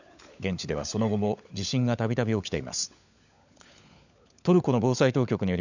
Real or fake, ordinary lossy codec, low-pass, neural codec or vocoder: fake; none; 7.2 kHz; codec, 16 kHz, 16 kbps, FunCodec, trained on LibriTTS, 50 frames a second